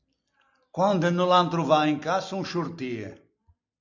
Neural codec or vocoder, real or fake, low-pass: none; real; 7.2 kHz